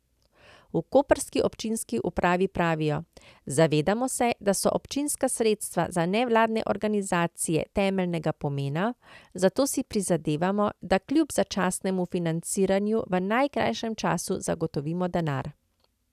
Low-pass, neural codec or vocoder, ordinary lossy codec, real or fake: 14.4 kHz; none; none; real